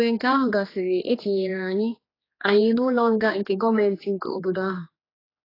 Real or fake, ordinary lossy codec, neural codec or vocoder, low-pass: fake; AAC, 24 kbps; codec, 16 kHz, 2 kbps, X-Codec, HuBERT features, trained on general audio; 5.4 kHz